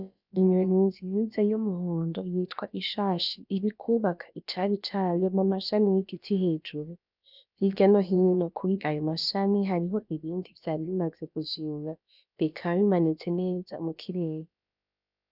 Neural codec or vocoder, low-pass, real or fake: codec, 16 kHz, about 1 kbps, DyCAST, with the encoder's durations; 5.4 kHz; fake